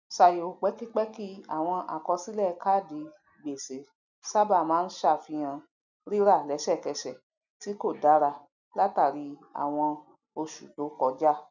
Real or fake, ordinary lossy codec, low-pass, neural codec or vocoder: real; none; 7.2 kHz; none